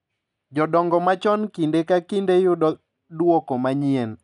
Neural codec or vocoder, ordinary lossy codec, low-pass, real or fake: none; none; 10.8 kHz; real